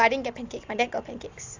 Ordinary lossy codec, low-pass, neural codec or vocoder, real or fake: AAC, 48 kbps; 7.2 kHz; none; real